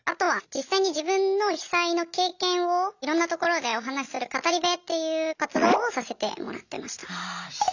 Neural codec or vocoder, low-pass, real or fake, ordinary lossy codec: none; 7.2 kHz; real; none